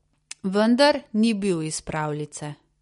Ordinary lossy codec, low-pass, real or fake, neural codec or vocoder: MP3, 48 kbps; 19.8 kHz; real; none